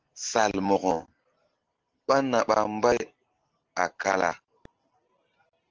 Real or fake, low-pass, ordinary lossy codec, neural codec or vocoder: real; 7.2 kHz; Opus, 16 kbps; none